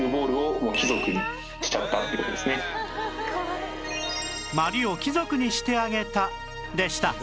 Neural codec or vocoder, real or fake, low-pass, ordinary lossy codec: none; real; none; none